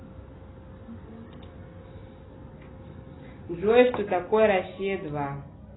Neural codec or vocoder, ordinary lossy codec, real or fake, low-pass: none; AAC, 16 kbps; real; 7.2 kHz